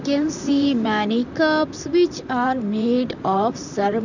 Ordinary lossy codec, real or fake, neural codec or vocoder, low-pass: none; fake; vocoder, 44.1 kHz, 128 mel bands, Pupu-Vocoder; 7.2 kHz